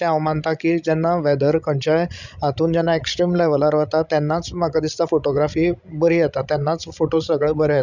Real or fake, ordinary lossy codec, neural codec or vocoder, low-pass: fake; none; codec, 16 kHz, 16 kbps, FreqCodec, larger model; 7.2 kHz